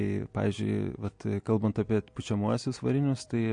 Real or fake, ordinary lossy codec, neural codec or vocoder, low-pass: real; MP3, 48 kbps; none; 9.9 kHz